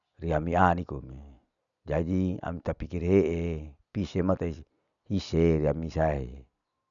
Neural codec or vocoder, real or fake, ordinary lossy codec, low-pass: none; real; none; 7.2 kHz